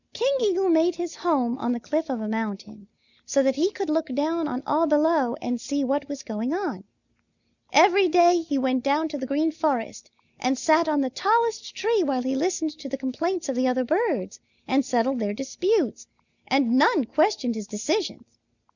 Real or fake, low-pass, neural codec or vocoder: real; 7.2 kHz; none